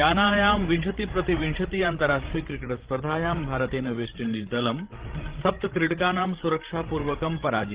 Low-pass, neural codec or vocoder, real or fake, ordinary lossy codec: 3.6 kHz; codec, 16 kHz, 16 kbps, FreqCodec, larger model; fake; Opus, 16 kbps